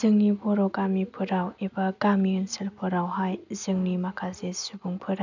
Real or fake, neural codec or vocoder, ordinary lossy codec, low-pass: real; none; none; 7.2 kHz